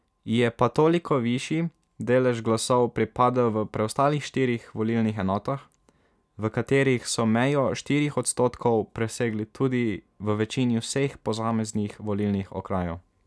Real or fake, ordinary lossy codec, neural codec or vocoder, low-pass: real; none; none; none